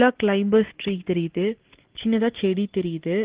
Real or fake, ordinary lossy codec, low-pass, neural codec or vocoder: real; Opus, 16 kbps; 3.6 kHz; none